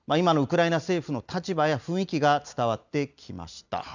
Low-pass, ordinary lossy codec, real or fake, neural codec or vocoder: 7.2 kHz; none; real; none